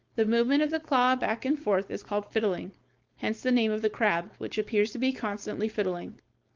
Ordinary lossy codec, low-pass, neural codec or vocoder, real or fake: Opus, 32 kbps; 7.2 kHz; codec, 16 kHz, 4.8 kbps, FACodec; fake